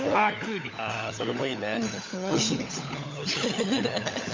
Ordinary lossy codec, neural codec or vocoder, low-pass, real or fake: MP3, 48 kbps; codec, 16 kHz, 16 kbps, FunCodec, trained on LibriTTS, 50 frames a second; 7.2 kHz; fake